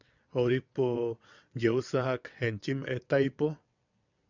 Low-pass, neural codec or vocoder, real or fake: 7.2 kHz; vocoder, 22.05 kHz, 80 mel bands, WaveNeXt; fake